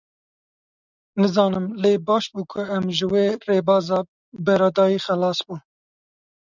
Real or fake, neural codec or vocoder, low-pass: real; none; 7.2 kHz